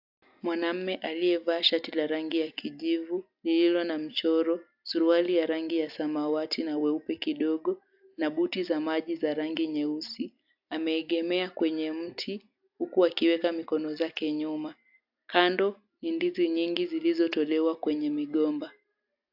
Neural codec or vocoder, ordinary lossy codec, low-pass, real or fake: none; AAC, 48 kbps; 5.4 kHz; real